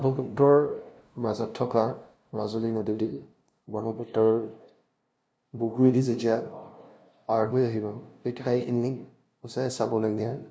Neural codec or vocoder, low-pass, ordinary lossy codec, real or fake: codec, 16 kHz, 0.5 kbps, FunCodec, trained on LibriTTS, 25 frames a second; none; none; fake